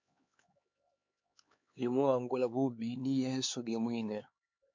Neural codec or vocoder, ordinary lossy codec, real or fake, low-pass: codec, 16 kHz, 4 kbps, X-Codec, HuBERT features, trained on LibriSpeech; MP3, 48 kbps; fake; 7.2 kHz